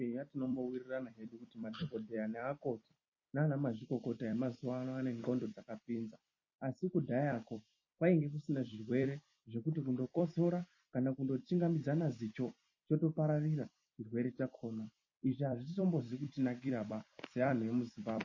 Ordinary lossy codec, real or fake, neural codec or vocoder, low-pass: MP3, 32 kbps; fake; vocoder, 44.1 kHz, 128 mel bands every 256 samples, BigVGAN v2; 7.2 kHz